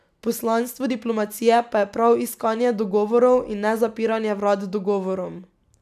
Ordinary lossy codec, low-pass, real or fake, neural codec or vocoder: none; 14.4 kHz; real; none